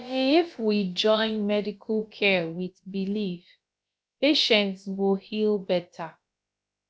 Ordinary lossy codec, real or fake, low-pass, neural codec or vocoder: none; fake; none; codec, 16 kHz, about 1 kbps, DyCAST, with the encoder's durations